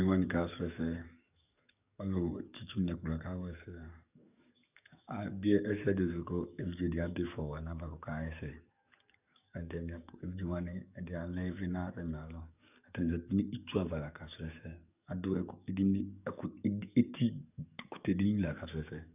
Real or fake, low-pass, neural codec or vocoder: fake; 3.6 kHz; codec, 44.1 kHz, 7.8 kbps, DAC